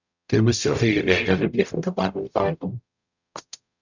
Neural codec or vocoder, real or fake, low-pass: codec, 44.1 kHz, 0.9 kbps, DAC; fake; 7.2 kHz